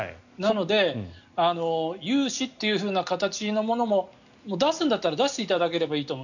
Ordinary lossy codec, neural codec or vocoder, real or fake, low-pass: none; none; real; 7.2 kHz